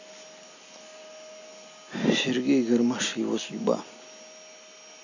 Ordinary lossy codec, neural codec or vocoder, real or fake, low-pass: none; none; real; 7.2 kHz